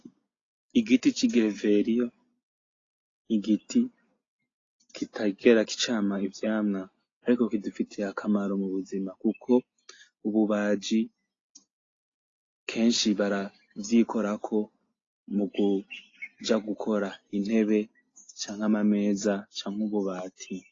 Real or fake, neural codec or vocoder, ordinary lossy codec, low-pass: real; none; AAC, 32 kbps; 7.2 kHz